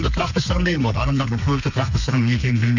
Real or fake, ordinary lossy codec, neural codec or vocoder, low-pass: fake; none; codec, 32 kHz, 1.9 kbps, SNAC; 7.2 kHz